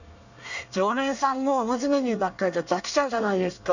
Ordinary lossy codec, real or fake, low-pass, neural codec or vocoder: none; fake; 7.2 kHz; codec, 24 kHz, 1 kbps, SNAC